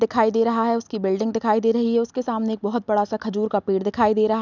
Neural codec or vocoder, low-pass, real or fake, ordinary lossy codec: none; 7.2 kHz; real; none